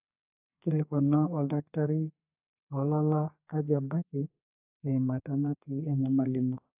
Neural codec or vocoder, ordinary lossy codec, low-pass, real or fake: codec, 32 kHz, 1.9 kbps, SNAC; AAC, 32 kbps; 3.6 kHz; fake